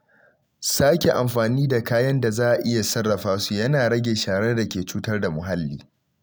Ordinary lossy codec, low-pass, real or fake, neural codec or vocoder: none; none; real; none